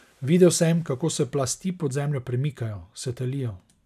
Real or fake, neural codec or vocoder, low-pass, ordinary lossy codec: real; none; 14.4 kHz; none